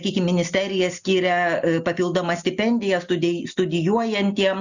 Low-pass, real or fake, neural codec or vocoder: 7.2 kHz; real; none